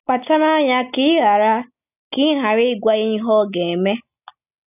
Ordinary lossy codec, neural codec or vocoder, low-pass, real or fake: none; none; 3.6 kHz; real